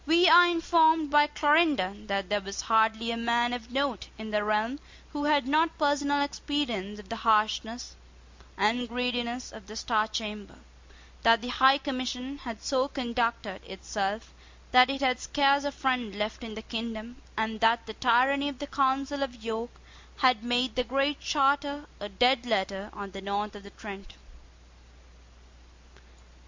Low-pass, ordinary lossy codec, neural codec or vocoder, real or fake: 7.2 kHz; MP3, 48 kbps; none; real